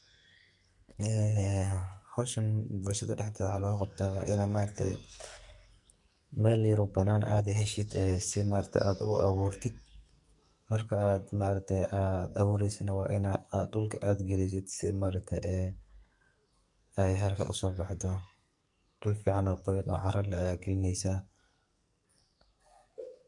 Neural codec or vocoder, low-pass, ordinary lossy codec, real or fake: codec, 44.1 kHz, 2.6 kbps, SNAC; 10.8 kHz; MP3, 64 kbps; fake